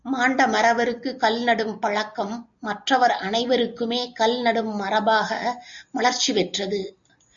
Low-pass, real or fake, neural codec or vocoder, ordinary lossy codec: 7.2 kHz; real; none; MP3, 64 kbps